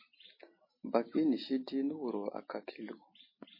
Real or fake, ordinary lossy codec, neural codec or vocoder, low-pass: real; MP3, 24 kbps; none; 5.4 kHz